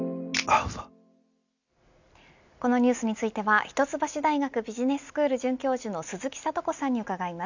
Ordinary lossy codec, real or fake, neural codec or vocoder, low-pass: none; real; none; 7.2 kHz